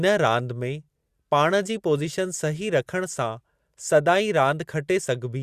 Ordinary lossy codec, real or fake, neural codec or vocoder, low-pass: Opus, 64 kbps; real; none; 14.4 kHz